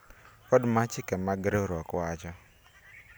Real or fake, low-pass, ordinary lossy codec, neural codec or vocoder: real; none; none; none